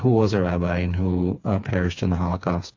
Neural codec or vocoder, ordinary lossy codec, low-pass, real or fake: codec, 16 kHz, 4 kbps, FreqCodec, smaller model; AAC, 48 kbps; 7.2 kHz; fake